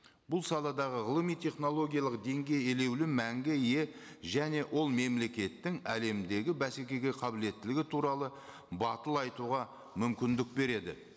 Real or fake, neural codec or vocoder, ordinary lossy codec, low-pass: real; none; none; none